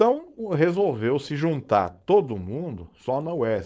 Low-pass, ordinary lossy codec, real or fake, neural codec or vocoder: none; none; fake; codec, 16 kHz, 4.8 kbps, FACodec